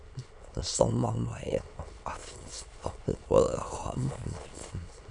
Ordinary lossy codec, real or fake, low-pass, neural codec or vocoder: MP3, 96 kbps; fake; 9.9 kHz; autoencoder, 22.05 kHz, a latent of 192 numbers a frame, VITS, trained on many speakers